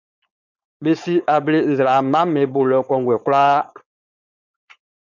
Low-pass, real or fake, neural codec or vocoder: 7.2 kHz; fake; codec, 16 kHz, 4.8 kbps, FACodec